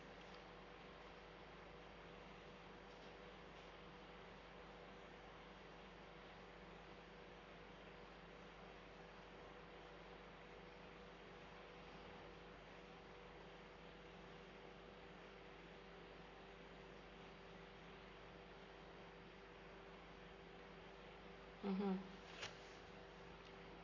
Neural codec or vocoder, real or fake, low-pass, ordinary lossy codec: codec, 44.1 kHz, 7.8 kbps, Pupu-Codec; fake; 7.2 kHz; none